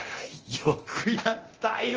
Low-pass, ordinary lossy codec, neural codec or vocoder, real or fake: 7.2 kHz; Opus, 24 kbps; codec, 24 kHz, 0.9 kbps, DualCodec; fake